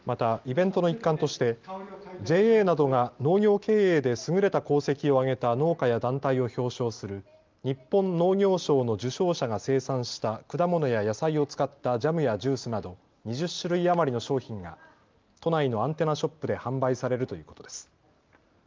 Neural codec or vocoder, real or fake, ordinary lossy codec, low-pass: none; real; Opus, 24 kbps; 7.2 kHz